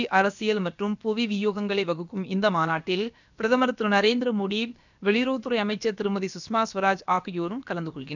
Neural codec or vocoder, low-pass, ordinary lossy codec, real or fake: codec, 16 kHz, about 1 kbps, DyCAST, with the encoder's durations; 7.2 kHz; none; fake